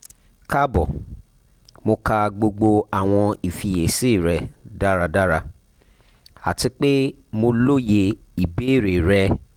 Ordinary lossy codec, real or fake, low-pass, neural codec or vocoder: Opus, 24 kbps; real; 19.8 kHz; none